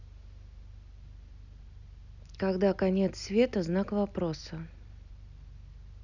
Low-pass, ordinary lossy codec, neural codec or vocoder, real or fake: 7.2 kHz; none; none; real